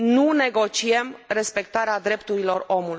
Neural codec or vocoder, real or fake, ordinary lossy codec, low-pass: none; real; none; none